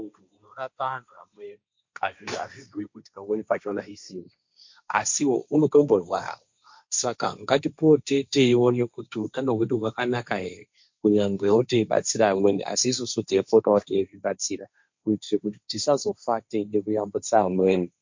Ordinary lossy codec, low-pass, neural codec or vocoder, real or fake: MP3, 48 kbps; 7.2 kHz; codec, 16 kHz, 1.1 kbps, Voila-Tokenizer; fake